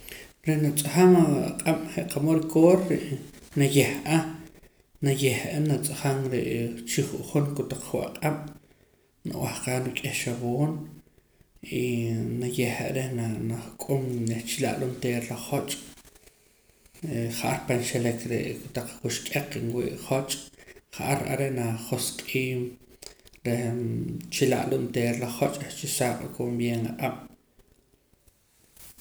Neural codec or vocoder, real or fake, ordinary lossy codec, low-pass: none; real; none; none